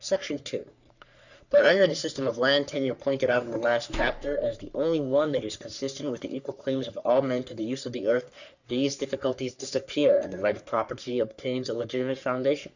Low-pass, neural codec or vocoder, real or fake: 7.2 kHz; codec, 44.1 kHz, 3.4 kbps, Pupu-Codec; fake